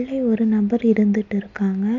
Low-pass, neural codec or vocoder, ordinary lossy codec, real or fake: 7.2 kHz; none; none; real